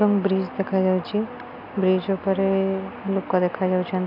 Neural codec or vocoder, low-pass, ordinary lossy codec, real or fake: none; 5.4 kHz; none; real